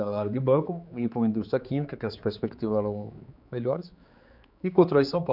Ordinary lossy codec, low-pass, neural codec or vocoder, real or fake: none; 5.4 kHz; codec, 16 kHz, 4 kbps, X-Codec, HuBERT features, trained on general audio; fake